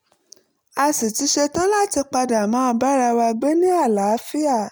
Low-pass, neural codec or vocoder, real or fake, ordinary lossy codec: none; none; real; none